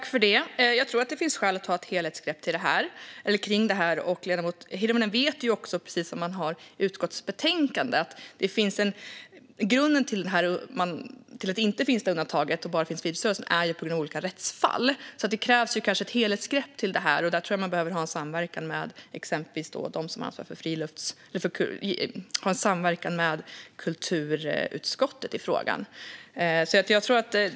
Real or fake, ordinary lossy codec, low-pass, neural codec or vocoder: real; none; none; none